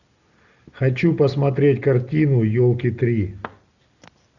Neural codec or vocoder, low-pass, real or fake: none; 7.2 kHz; real